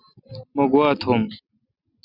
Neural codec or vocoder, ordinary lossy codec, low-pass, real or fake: none; Opus, 64 kbps; 5.4 kHz; real